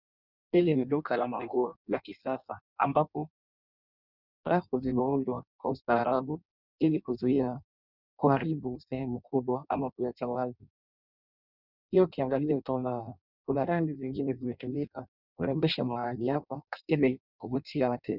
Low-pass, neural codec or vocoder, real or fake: 5.4 kHz; codec, 16 kHz in and 24 kHz out, 0.6 kbps, FireRedTTS-2 codec; fake